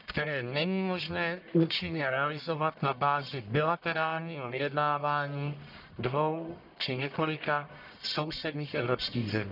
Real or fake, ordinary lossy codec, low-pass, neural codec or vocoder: fake; none; 5.4 kHz; codec, 44.1 kHz, 1.7 kbps, Pupu-Codec